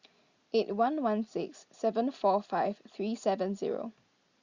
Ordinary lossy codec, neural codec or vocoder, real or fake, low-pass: Opus, 64 kbps; none; real; 7.2 kHz